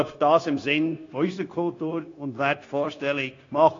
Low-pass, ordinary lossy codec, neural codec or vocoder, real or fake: 7.2 kHz; AAC, 32 kbps; codec, 16 kHz, 0.9 kbps, LongCat-Audio-Codec; fake